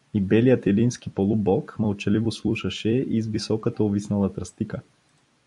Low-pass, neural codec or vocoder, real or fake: 10.8 kHz; vocoder, 44.1 kHz, 128 mel bands every 256 samples, BigVGAN v2; fake